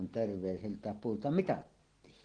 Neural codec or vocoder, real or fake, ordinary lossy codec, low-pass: none; real; Opus, 16 kbps; 9.9 kHz